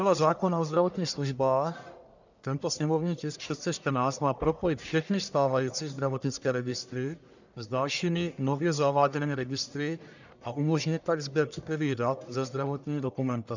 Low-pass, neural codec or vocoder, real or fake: 7.2 kHz; codec, 44.1 kHz, 1.7 kbps, Pupu-Codec; fake